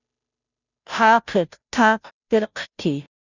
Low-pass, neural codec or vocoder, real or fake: 7.2 kHz; codec, 16 kHz, 0.5 kbps, FunCodec, trained on Chinese and English, 25 frames a second; fake